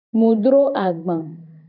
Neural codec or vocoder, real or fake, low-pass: vocoder, 44.1 kHz, 128 mel bands every 512 samples, BigVGAN v2; fake; 5.4 kHz